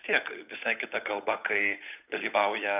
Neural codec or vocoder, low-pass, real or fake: codec, 16 kHz, 8 kbps, FunCodec, trained on Chinese and English, 25 frames a second; 3.6 kHz; fake